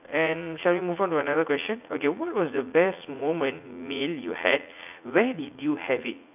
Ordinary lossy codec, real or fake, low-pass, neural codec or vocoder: none; fake; 3.6 kHz; vocoder, 44.1 kHz, 80 mel bands, Vocos